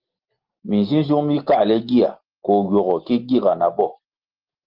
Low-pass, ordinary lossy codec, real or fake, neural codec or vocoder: 5.4 kHz; Opus, 16 kbps; real; none